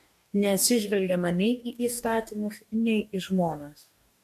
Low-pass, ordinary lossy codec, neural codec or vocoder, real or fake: 14.4 kHz; MP3, 64 kbps; codec, 44.1 kHz, 2.6 kbps, DAC; fake